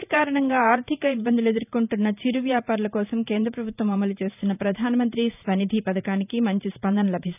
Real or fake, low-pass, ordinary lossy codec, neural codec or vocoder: fake; 3.6 kHz; none; vocoder, 44.1 kHz, 128 mel bands every 512 samples, BigVGAN v2